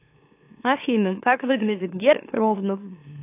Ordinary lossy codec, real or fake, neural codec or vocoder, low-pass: AAC, 24 kbps; fake; autoencoder, 44.1 kHz, a latent of 192 numbers a frame, MeloTTS; 3.6 kHz